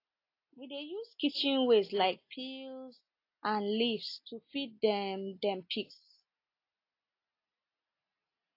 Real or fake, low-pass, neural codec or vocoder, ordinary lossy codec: real; 5.4 kHz; none; AAC, 32 kbps